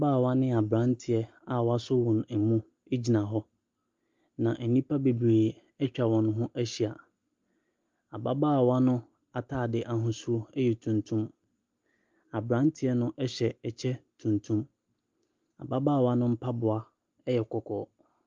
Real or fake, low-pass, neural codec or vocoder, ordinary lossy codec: real; 7.2 kHz; none; Opus, 32 kbps